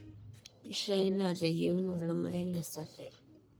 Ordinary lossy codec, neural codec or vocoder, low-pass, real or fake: none; codec, 44.1 kHz, 1.7 kbps, Pupu-Codec; none; fake